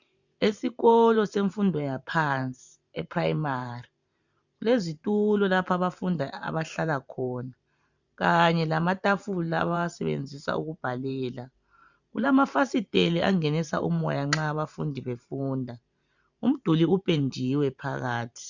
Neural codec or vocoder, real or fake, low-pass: vocoder, 44.1 kHz, 128 mel bands every 512 samples, BigVGAN v2; fake; 7.2 kHz